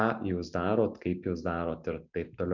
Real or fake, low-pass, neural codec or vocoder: real; 7.2 kHz; none